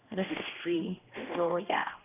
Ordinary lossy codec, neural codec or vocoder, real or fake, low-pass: none; codec, 16 kHz, 1 kbps, X-Codec, HuBERT features, trained on general audio; fake; 3.6 kHz